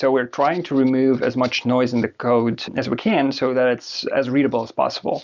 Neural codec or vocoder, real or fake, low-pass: none; real; 7.2 kHz